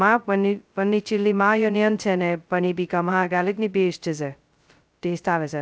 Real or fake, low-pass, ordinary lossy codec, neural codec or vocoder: fake; none; none; codec, 16 kHz, 0.2 kbps, FocalCodec